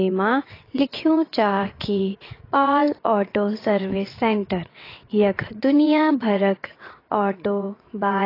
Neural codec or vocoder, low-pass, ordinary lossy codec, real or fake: vocoder, 22.05 kHz, 80 mel bands, WaveNeXt; 5.4 kHz; AAC, 24 kbps; fake